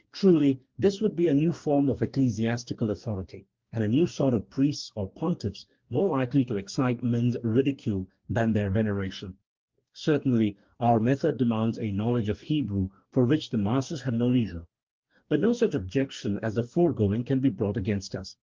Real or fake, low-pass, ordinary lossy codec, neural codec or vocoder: fake; 7.2 kHz; Opus, 32 kbps; codec, 44.1 kHz, 2.6 kbps, DAC